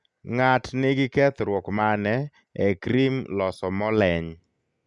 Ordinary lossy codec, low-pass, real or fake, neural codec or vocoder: none; 10.8 kHz; real; none